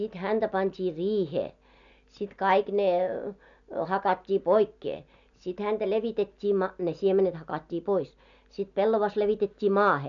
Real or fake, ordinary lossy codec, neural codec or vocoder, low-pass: real; none; none; 7.2 kHz